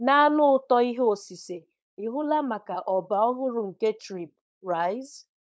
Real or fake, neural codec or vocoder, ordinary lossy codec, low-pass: fake; codec, 16 kHz, 4.8 kbps, FACodec; none; none